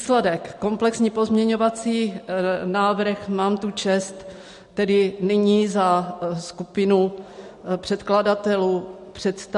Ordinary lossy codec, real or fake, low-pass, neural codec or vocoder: MP3, 48 kbps; real; 14.4 kHz; none